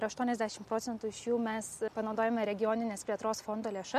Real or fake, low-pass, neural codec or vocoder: real; 14.4 kHz; none